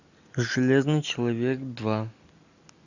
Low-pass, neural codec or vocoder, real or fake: 7.2 kHz; none; real